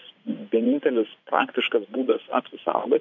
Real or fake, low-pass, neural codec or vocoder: fake; 7.2 kHz; vocoder, 24 kHz, 100 mel bands, Vocos